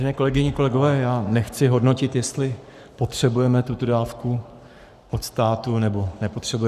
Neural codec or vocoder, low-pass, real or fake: codec, 44.1 kHz, 7.8 kbps, Pupu-Codec; 14.4 kHz; fake